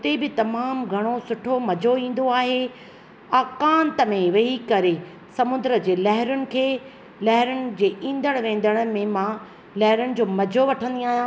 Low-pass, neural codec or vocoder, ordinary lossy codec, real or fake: none; none; none; real